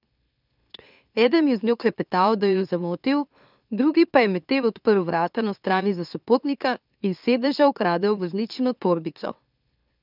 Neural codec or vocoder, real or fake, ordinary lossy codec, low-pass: autoencoder, 44.1 kHz, a latent of 192 numbers a frame, MeloTTS; fake; none; 5.4 kHz